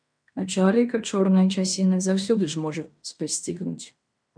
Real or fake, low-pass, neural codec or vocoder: fake; 9.9 kHz; codec, 16 kHz in and 24 kHz out, 0.9 kbps, LongCat-Audio-Codec, fine tuned four codebook decoder